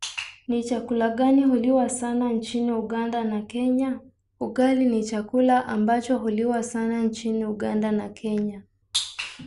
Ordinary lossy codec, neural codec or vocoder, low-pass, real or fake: Opus, 64 kbps; none; 10.8 kHz; real